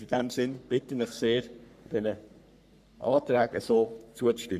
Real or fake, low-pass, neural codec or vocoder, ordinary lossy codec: fake; 14.4 kHz; codec, 44.1 kHz, 3.4 kbps, Pupu-Codec; none